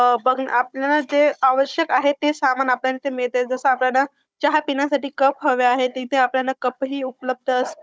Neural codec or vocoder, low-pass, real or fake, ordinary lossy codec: codec, 16 kHz, 16 kbps, FunCodec, trained on Chinese and English, 50 frames a second; none; fake; none